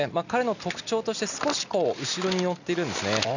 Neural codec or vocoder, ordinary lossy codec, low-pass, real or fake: none; none; 7.2 kHz; real